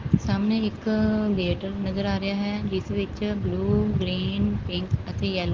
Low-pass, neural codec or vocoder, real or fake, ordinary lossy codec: 7.2 kHz; none; real; Opus, 16 kbps